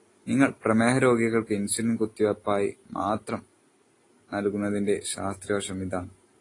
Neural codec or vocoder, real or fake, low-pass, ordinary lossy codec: none; real; 10.8 kHz; AAC, 32 kbps